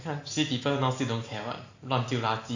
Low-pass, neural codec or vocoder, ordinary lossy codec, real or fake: 7.2 kHz; none; AAC, 48 kbps; real